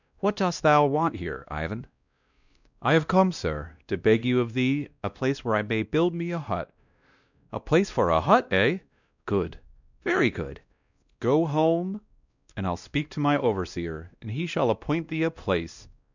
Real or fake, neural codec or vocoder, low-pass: fake; codec, 16 kHz, 1 kbps, X-Codec, WavLM features, trained on Multilingual LibriSpeech; 7.2 kHz